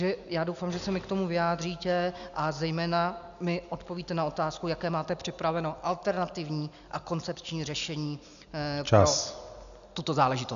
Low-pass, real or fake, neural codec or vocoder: 7.2 kHz; real; none